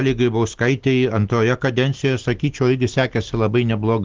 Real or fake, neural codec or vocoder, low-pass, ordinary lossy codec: real; none; 7.2 kHz; Opus, 16 kbps